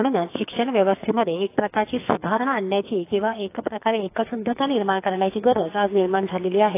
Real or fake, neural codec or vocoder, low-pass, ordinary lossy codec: fake; codec, 44.1 kHz, 2.6 kbps, SNAC; 3.6 kHz; AAC, 24 kbps